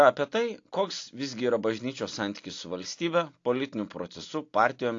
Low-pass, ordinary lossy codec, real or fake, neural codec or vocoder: 7.2 kHz; AAC, 48 kbps; real; none